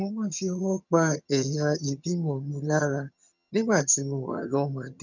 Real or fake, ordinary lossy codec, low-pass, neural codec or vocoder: fake; none; 7.2 kHz; vocoder, 22.05 kHz, 80 mel bands, HiFi-GAN